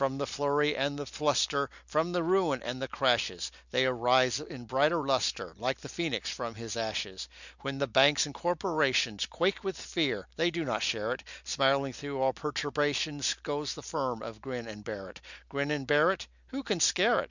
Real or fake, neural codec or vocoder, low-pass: real; none; 7.2 kHz